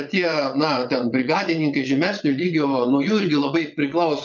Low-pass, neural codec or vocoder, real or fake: 7.2 kHz; vocoder, 22.05 kHz, 80 mel bands, WaveNeXt; fake